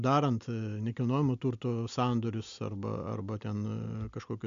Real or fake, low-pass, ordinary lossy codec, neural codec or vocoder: real; 7.2 kHz; MP3, 64 kbps; none